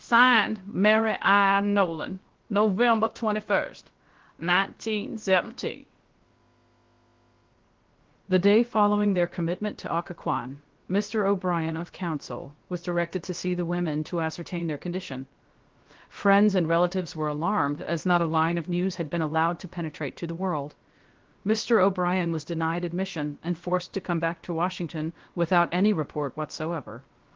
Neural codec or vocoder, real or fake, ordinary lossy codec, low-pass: codec, 16 kHz, about 1 kbps, DyCAST, with the encoder's durations; fake; Opus, 16 kbps; 7.2 kHz